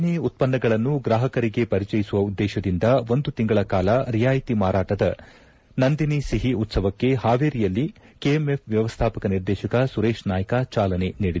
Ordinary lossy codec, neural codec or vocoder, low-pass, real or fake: none; none; none; real